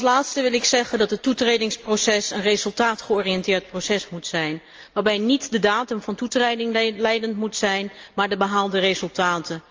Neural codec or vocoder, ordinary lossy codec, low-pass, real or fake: none; Opus, 24 kbps; 7.2 kHz; real